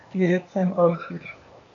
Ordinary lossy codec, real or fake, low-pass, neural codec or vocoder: AAC, 48 kbps; fake; 7.2 kHz; codec, 16 kHz, 0.8 kbps, ZipCodec